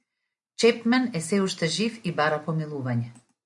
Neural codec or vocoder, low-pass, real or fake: none; 10.8 kHz; real